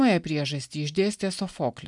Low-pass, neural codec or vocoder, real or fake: 10.8 kHz; none; real